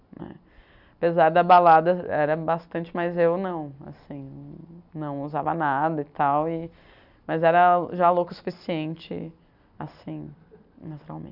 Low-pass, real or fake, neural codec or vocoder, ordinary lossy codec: 5.4 kHz; real; none; none